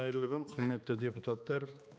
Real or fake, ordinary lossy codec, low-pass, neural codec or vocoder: fake; none; none; codec, 16 kHz, 2 kbps, X-Codec, HuBERT features, trained on balanced general audio